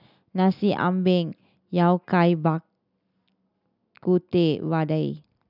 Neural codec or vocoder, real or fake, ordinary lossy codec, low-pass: none; real; none; 5.4 kHz